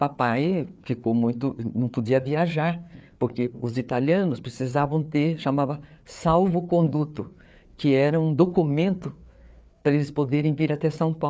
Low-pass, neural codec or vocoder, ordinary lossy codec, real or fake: none; codec, 16 kHz, 4 kbps, FreqCodec, larger model; none; fake